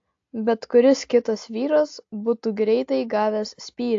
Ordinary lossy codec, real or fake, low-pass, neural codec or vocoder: AAC, 48 kbps; real; 7.2 kHz; none